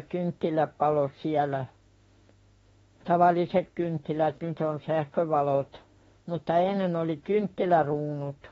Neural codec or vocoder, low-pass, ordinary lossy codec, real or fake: autoencoder, 48 kHz, 32 numbers a frame, DAC-VAE, trained on Japanese speech; 19.8 kHz; AAC, 24 kbps; fake